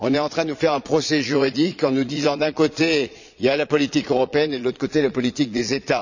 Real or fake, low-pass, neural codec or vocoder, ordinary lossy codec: fake; 7.2 kHz; vocoder, 22.05 kHz, 80 mel bands, Vocos; none